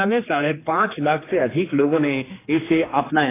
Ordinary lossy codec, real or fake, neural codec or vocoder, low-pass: AAC, 16 kbps; fake; codec, 16 kHz, 2 kbps, X-Codec, HuBERT features, trained on general audio; 3.6 kHz